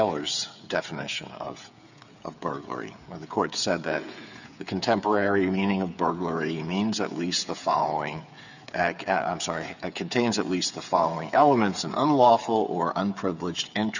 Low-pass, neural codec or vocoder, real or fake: 7.2 kHz; codec, 16 kHz, 8 kbps, FreqCodec, smaller model; fake